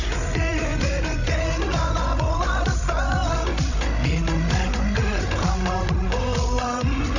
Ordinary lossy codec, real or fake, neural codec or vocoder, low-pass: none; fake; codec, 16 kHz, 16 kbps, FreqCodec, larger model; 7.2 kHz